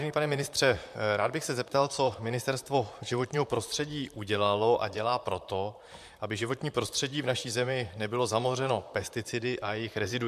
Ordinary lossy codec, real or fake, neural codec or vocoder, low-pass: MP3, 96 kbps; fake; vocoder, 44.1 kHz, 128 mel bands, Pupu-Vocoder; 14.4 kHz